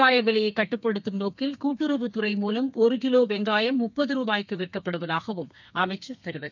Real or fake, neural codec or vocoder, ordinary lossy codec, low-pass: fake; codec, 32 kHz, 1.9 kbps, SNAC; none; 7.2 kHz